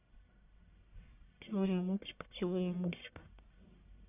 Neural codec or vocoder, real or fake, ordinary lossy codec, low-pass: codec, 44.1 kHz, 1.7 kbps, Pupu-Codec; fake; none; 3.6 kHz